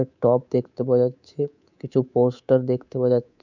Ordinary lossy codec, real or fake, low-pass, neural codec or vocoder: none; fake; 7.2 kHz; codec, 24 kHz, 3.1 kbps, DualCodec